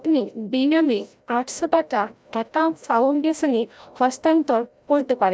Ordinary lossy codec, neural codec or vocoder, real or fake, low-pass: none; codec, 16 kHz, 0.5 kbps, FreqCodec, larger model; fake; none